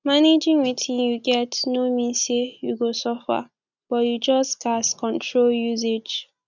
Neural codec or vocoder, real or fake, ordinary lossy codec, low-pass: none; real; none; 7.2 kHz